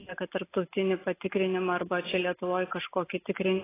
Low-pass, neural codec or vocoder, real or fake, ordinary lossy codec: 3.6 kHz; none; real; AAC, 16 kbps